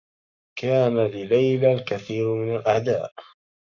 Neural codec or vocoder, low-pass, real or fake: codec, 44.1 kHz, 7.8 kbps, Pupu-Codec; 7.2 kHz; fake